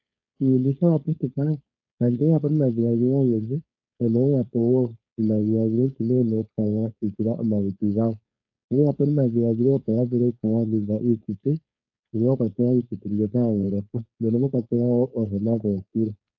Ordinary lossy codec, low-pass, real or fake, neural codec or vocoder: AAC, 48 kbps; 7.2 kHz; fake; codec, 16 kHz, 4.8 kbps, FACodec